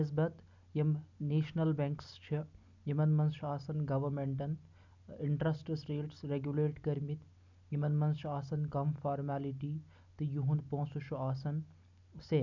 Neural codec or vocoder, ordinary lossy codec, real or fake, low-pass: none; none; real; 7.2 kHz